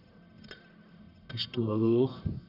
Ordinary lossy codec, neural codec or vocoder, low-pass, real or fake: none; codec, 44.1 kHz, 1.7 kbps, Pupu-Codec; 5.4 kHz; fake